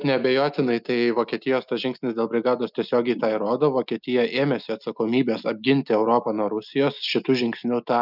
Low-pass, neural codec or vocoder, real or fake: 5.4 kHz; none; real